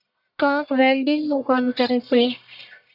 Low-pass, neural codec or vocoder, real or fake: 5.4 kHz; codec, 44.1 kHz, 1.7 kbps, Pupu-Codec; fake